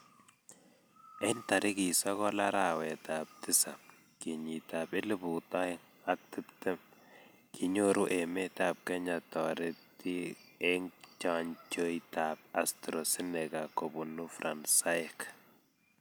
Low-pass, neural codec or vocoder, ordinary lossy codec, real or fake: none; none; none; real